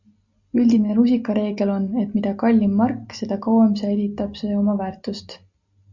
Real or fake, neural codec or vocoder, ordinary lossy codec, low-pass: real; none; Opus, 64 kbps; 7.2 kHz